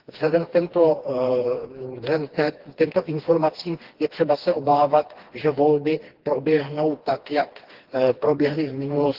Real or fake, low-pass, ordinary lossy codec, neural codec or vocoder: fake; 5.4 kHz; Opus, 16 kbps; codec, 16 kHz, 2 kbps, FreqCodec, smaller model